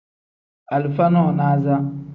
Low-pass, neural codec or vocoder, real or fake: 7.2 kHz; none; real